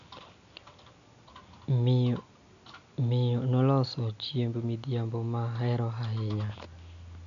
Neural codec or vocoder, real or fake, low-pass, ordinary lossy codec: none; real; 7.2 kHz; none